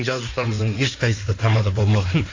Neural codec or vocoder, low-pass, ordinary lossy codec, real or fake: codec, 16 kHz in and 24 kHz out, 2.2 kbps, FireRedTTS-2 codec; 7.2 kHz; AAC, 32 kbps; fake